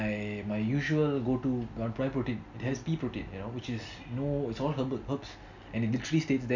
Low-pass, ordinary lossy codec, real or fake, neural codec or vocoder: 7.2 kHz; none; real; none